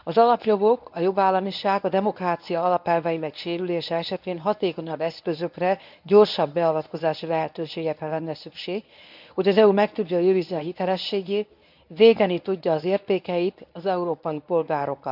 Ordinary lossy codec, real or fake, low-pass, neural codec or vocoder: none; fake; 5.4 kHz; codec, 24 kHz, 0.9 kbps, WavTokenizer, medium speech release version 1